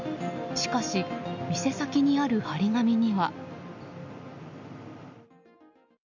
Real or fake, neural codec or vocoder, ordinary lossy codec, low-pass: real; none; none; 7.2 kHz